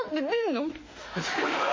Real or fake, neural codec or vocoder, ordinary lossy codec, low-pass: fake; autoencoder, 48 kHz, 32 numbers a frame, DAC-VAE, trained on Japanese speech; MP3, 32 kbps; 7.2 kHz